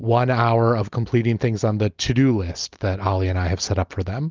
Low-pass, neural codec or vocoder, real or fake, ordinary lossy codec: 7.2 kHz; none; real; Opus, 32 kbps